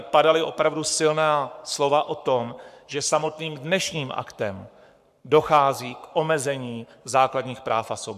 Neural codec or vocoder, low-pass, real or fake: codec, 44.1 kHz, 7.8 kbps, Pupu-Codec; 14.4 kHz; fake